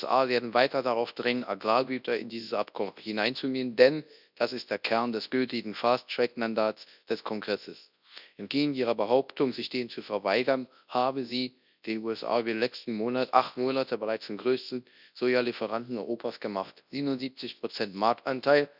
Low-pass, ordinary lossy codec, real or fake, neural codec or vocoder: 5.4 kHz; none; fake; codec, 24 kHz, 0.9 kbps, WavTokenizer, large speech release